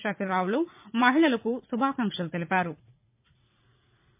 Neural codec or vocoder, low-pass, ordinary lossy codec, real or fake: vocoder, 44.1 kHz, 80 mel bands, Vocos; 3.6 kHz; MP3, 32 kbps; fake